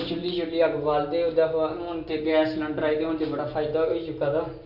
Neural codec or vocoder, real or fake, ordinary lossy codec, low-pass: none; real; none; 5.4 kHz